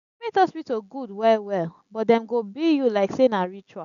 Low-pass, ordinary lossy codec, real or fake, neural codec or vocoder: 7.2 kHz; none; real; none